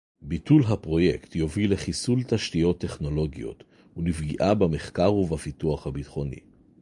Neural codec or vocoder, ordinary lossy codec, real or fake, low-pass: none; MP3, 96 kbps; real; 10.8 kHz